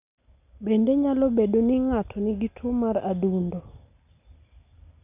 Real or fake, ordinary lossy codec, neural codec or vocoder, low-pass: real; none; none; 3.6 kHz